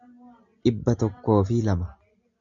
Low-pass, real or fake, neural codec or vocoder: 7.2 kHz; real; none